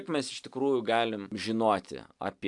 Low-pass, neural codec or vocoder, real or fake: 10.8 kHz; none; real